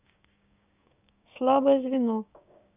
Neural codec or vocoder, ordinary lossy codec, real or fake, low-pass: codec, 16 kHz, 6 kbps, DAC; none; fake; 3.6 kHz